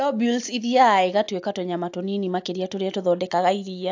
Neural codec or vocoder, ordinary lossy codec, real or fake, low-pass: none; AAC, 48 kbps; real; 7.2 kHz